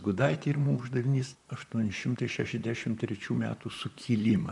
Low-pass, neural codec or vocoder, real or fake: 10.8 kHz; vocoder, 44.1 kHz, 128 mel bands, Pupu-Vocoder; fake